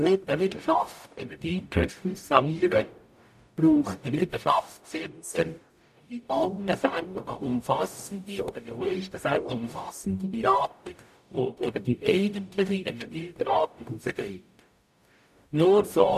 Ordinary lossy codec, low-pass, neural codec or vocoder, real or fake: none; 14.4 kHz; codec, 44.1 kHz, 0.9 kbps, DAC; fake